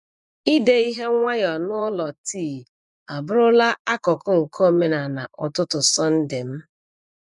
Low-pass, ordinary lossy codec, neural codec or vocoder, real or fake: 10.8 kHz; none; vocoder, 24 kHz, 100 mel bands, Vocos; fake